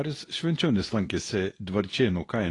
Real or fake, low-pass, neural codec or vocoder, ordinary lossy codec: fake; 10.8 kHz; codec, 24 kHz, 0.9 kbps, WavTokenizer, medium speech release version 2; AAC, 32 kbps